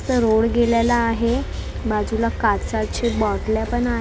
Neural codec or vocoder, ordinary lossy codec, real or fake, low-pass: none; none; real; none